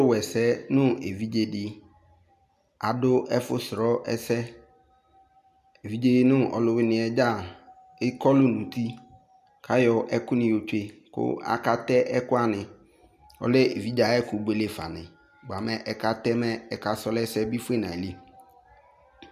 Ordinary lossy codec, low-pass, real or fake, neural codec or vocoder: MP3, 96 kbps; 14.4 kHz; real; none